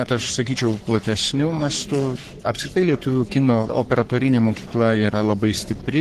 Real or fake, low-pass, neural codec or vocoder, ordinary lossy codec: fake; 14.4 kHz; codec, 44.1 kHz, 3.4 kbps, Pupu-Codec; Opus, 16 kbps